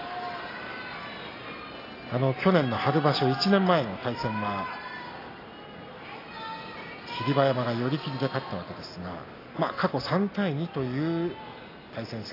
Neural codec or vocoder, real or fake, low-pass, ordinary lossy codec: none; real; 5.4 kHz; AAC, 24 kbps